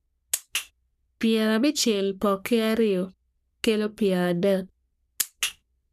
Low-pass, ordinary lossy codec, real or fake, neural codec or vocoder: 14.4 kHz; none; fake; codec, 44.1 kHz, 3.4 kbps, Pupu-Codec